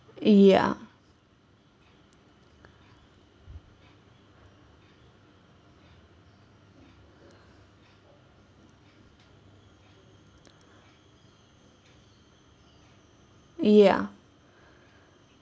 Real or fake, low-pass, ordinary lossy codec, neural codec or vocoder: real; none; none; none